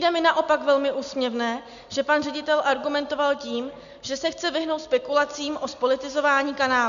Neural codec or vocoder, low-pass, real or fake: none; 7.2 kHz; real